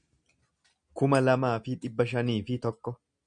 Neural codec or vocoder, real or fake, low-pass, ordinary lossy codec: none; real; 9.9 kHz; MP3, 96 kbps